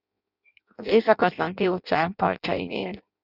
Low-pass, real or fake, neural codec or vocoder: 5.4 kHz; fake; codec, 16 kHz in and 24 kHz out, 0.6 kbps, FireRedTTS-2 codec